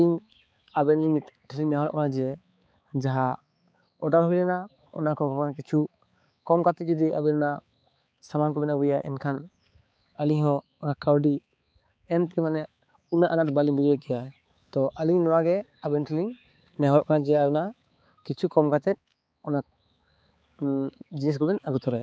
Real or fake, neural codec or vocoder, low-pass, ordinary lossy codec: fake; codec, 16 kHz, 4 kbps, X-Codec, HuBERT features, trained on balanced general audio; none; none